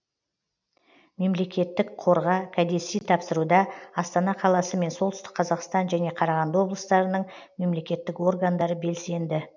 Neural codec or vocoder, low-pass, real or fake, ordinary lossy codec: none; 7.2 kHz; real; none